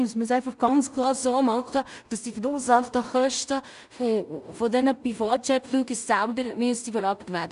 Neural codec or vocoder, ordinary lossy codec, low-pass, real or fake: codec, 16 kHz in and 24 kHz out, 0.4 kbps, LongCat-Audio-Codec, two codebook decoder; none; 10.8 kHz; fake